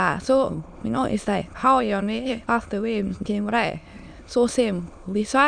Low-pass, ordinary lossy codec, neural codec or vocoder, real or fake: 9.9 kHz; none; autoencoder, 22.05 kHz, a latent of 192 numbers a frame, VITS, trained on many speakers; fake